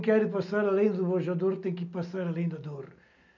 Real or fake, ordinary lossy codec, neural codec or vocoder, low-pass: real; none; none; 7.2 kHz